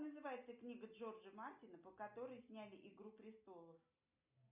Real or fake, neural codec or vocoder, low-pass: real; none; 3.6 kHz